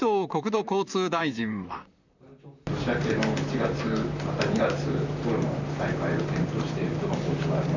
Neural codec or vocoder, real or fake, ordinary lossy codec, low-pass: vocoder, 44.1 kHz, 128 mel bands, Pupu-Vocoder; fake; none; 7.2 kHz